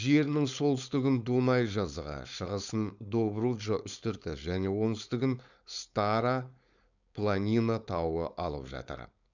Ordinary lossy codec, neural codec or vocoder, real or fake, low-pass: none; codec, 16 kHz, 4.8 kbps, FACodec; fake; 7.2 kHz